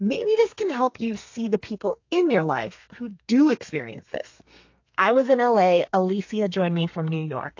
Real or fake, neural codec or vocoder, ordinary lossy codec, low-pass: fake; codec, 32 kHz, 1.9 kbps, SNAC; AAC, 48 kbps; 7.2 kHz